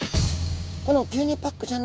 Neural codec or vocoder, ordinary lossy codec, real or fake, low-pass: codec, 16 kHz, 6 kbps, DAC; none; fake; none